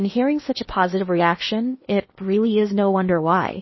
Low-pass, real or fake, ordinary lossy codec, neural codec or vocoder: 7.2 kHz; fake; MP3, 24 kbps; codec, 16 kHz in and 24 kHz out, 0.8 kbps, FocalCodec, streaming, 65536 codes